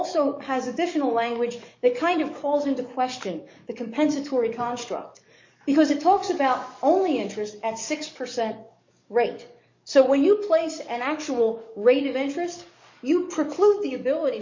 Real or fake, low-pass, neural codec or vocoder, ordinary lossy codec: fake; 7.2 kHz; codec, 44.1 kHz, 7.8 kbps, DAC; MP3, 48 kbps